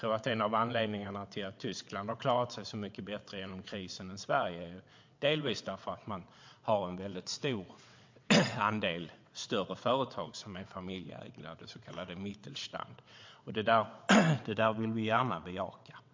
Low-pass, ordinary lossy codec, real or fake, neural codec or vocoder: 7.2 kHz; MP3, 48 kbps; fake; vocoder, 22.05 kHz, 80 mel bands, WaveNeXt